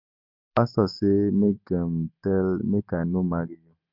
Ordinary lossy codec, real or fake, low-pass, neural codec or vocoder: MP3, 48 kbps; real; 5.4 kHz; none